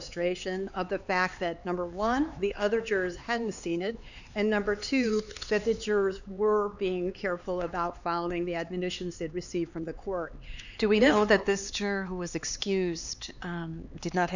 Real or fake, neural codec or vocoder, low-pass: fake; codec, 16 kHz, 4 kbps, X-Codec, HuBERT features, trained on LibriSpeech; 7.2 kHz